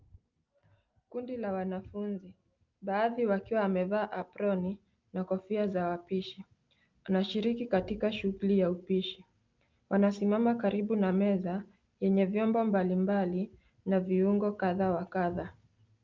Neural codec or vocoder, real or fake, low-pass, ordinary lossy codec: none; real; 7.2 kHz; Opus, 24 kbps